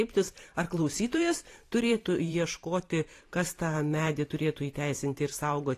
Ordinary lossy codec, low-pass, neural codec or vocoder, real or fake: AAC, 48 kbps; 14.4 kHz; vocoder, 44.1 kHz, 128 mel bands every 512 samples, BigVGAN v2; fake